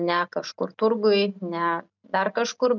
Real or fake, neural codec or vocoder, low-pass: real; none; 7.2 kHz